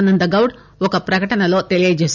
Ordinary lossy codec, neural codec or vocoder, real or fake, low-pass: none; none; real; 7.2 kHz